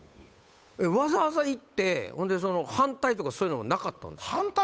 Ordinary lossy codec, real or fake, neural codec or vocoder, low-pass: none; fake; codec, 16 kHz, 8 kbps, FunCodec, trained on Chinese and English, 25 frames a second; none